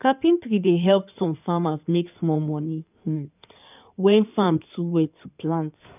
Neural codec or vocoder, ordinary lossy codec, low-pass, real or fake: codec, 16 kHz in and 24 kHz out, 2.2 kbps, FireRedTTS-2 codec; none; 3.6 kHz; fake